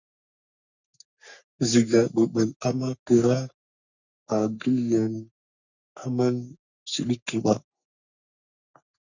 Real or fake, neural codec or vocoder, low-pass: fake; codec, 44.1 kHz, 3.4 kbps, Pupu-Codec; 7.2 kHz